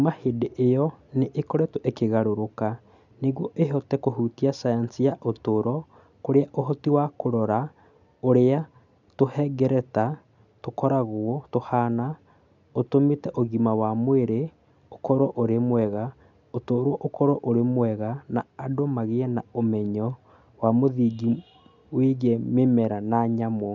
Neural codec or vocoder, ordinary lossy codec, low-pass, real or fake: none; none; 7.2 kHz; real